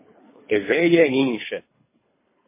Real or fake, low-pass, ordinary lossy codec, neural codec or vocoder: fake; 3.6 kHz; MP3, 16 kbps; codec, 24 kHz, 3 kbps, HILCodec